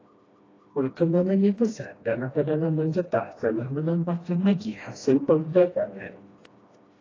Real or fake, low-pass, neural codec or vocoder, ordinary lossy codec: fake; 7.2 kHz; codec, 16 kHz, 1 kbps, FreqCodec, smaller model; AAC, 32 kbps